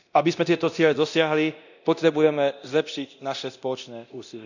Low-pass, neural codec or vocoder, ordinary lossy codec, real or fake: 7.2 kHz; codec, 24 kHz, 0.9 kbps, DualCodec; none; fake